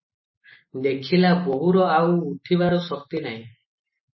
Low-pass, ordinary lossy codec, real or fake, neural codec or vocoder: 7.2 kHz; MP3, 24 kbps; real; none